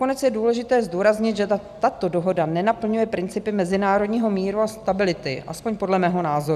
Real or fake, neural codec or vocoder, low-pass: real; none; 14.4 kHz